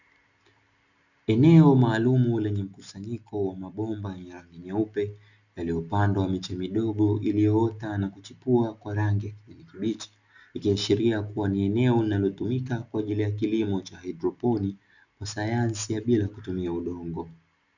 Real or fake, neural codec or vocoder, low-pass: real; none; 7.2 kHz